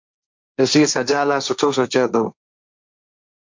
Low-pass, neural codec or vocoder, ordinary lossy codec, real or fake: 7.2 kHz; codec, 16 kHz, 1.1 kbps, Voila-Tokenizer; MP3, 64 kbps; fake